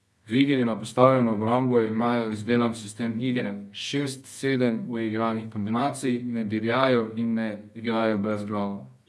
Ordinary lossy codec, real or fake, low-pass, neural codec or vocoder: none; fake; none; codec, 24 kHz, 0.9 kbps, WavTokenizer, medium music audio release